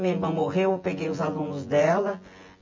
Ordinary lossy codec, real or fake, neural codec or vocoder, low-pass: MP3, 64 kbps; fake; vocoder, 24 kHz, 100 mel bands, Vocos; 7.2 kHz